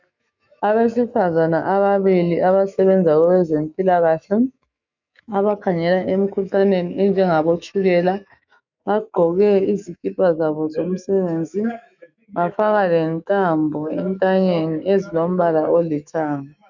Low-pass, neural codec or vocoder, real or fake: 7.2 kHz; autoencoder, 48 kHz, 128 numbers a frame, DAC-VAE, trained on Japanese speech; fake